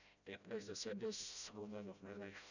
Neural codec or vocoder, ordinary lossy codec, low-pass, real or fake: codec, 16 kHz, 0.5 kbps, FreqCodec, smaller model; none; 7.2 kHz; fake